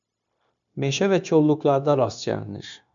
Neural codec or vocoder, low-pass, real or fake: codec, 16 kHz, 0.9 kbps, LongCat-Audio-Codec; 7.2 kHz; fake